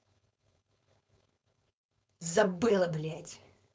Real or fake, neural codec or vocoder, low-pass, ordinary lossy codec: fake; codec, 16 kHz, 4.8 kbps, FACodec; none; none